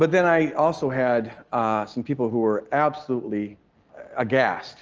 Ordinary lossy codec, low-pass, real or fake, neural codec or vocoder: Opus, 24 kbps; 7.2 kHz; real; none